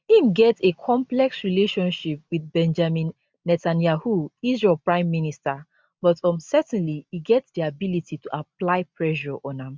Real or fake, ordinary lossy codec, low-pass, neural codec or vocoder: real; none; none; none